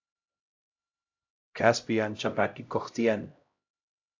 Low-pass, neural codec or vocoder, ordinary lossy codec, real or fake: 7.2 kHz; codec, 16 kHz, 0.5 kbps, X-Codec, HuBERT features, trained on LibriSpeech; AAC, 48 kbps; fake